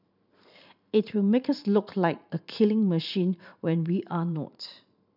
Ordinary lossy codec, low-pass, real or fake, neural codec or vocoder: none; 5.4 kHz; fake; vocoder, 44.1 kHz, 128 mel bands every 512 samples, BigVGAN v2